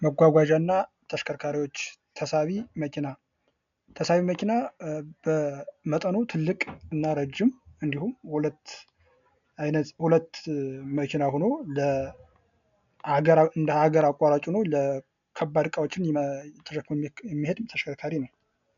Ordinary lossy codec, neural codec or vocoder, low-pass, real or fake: MP3, 96 kbps; none; 7.2 kHz; real